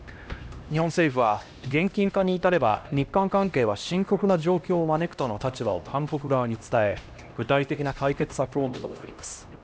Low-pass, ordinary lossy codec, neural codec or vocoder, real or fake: none; none; codec, 16 kHz, 1 kbps, X-Codec, HuBERT features, trained on LibriSpeech; fake